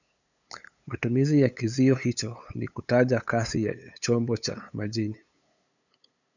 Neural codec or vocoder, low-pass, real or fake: codec, 16 kHz, 8 kbps, FunCodec, trained on LibriTTS, 25 frames a second; 7.2 kHz; fake